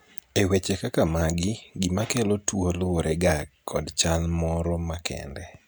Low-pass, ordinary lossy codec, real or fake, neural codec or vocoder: none; none; real; none